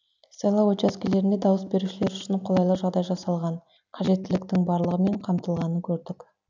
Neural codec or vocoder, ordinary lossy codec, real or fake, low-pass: none; none; real; 7.2 kHz